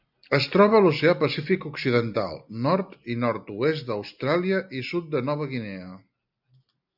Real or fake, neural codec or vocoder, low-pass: real; none; 5.4 kHz